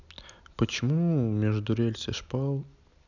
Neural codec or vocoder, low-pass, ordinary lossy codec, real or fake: none; 7.2 kHz; none; real